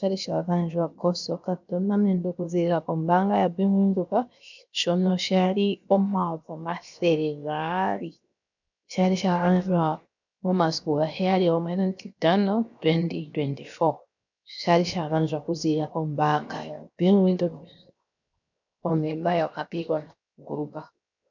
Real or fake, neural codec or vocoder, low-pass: fake; codec, 16 kHz, 0.7 kbps, FocalCodec; 7.2 kHz